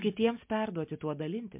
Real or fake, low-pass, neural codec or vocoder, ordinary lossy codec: real; 3.6 kHz; none; MP3, 32 kbps